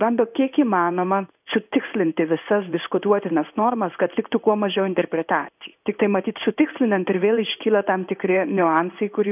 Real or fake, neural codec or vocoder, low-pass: fake; codec, 16 kHz in and 24 kHz out, 1 kbps, XY-Tokenizer; 3.6 kHz